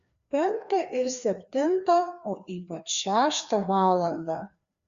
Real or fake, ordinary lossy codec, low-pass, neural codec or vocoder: fake; Opus, 64 kbps; 7.2 kHz; codec, 16 kHz, 2 kbps, FreqCodec, larger model